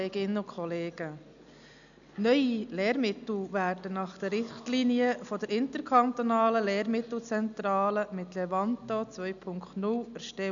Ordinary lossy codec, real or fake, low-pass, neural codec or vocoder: none; real; 7.2 kHz; none